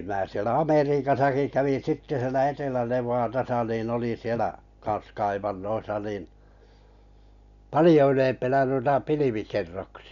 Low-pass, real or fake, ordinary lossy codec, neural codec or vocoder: 7.2 kHz; real; none; none